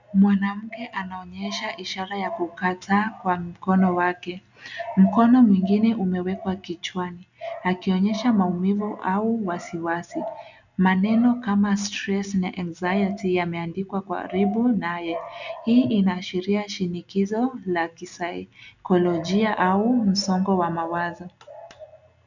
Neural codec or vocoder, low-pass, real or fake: none; 7.2 kHz; real